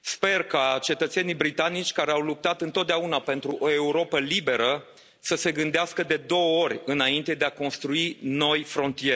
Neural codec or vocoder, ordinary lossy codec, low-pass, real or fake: none; none; none; real